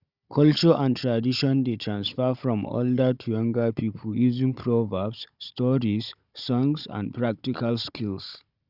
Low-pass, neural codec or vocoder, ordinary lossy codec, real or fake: 5.4 kHz; codec, 16 kHz, 16 kbps, FunCodec, trained on Chinese and English, 50 frames a second; Opus, 64 kbps; fake